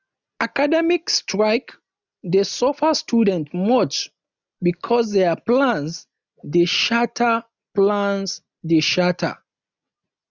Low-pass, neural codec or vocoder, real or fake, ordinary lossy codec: 7.2 kHz; none; real; none